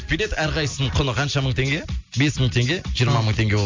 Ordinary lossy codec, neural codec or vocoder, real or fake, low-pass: MP3, 64 kbps; none; real; 7.2 kHz